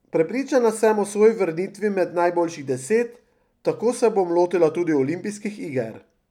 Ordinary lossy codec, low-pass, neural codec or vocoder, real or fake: none; 19.8 kHz; none; real